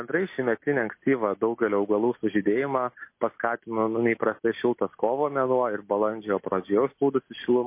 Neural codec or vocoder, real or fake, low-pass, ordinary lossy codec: none; real; 3.6 kHz; MP3, 24 kbps